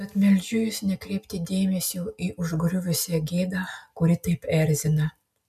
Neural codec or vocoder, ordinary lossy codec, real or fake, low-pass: none; MP3, 96 kbps; real; 14.4 kHz